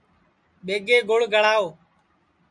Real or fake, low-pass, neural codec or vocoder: real; 9.9 kHz; none